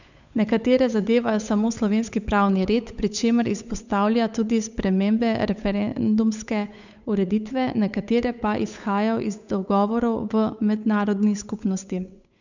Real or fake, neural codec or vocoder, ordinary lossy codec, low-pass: fake; codec, 16 kHz, 8 kbps, FunCodec, trained on Chinese and English, 25 frames a second; none; 7.2 kHz